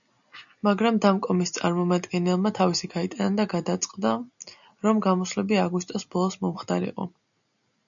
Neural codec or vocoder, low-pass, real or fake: none; 7.2 kHz; real